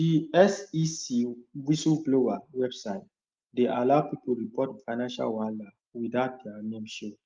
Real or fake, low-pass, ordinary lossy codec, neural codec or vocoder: real; 7.2 kHz; Opus, 32 kbps; none